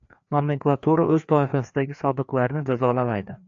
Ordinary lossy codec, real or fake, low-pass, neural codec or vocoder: AAC, 64 kbps; fake; 7.2 kHz; codec, 16 kHz, 2 kbps, FreqCodec, larger model